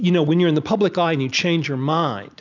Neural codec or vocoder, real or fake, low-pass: none; real; 7.2 kHz